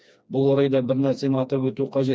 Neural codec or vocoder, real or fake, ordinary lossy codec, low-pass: codec, 16 kHz, 2 kbps, FreqCodec, smaller model; fake; none; none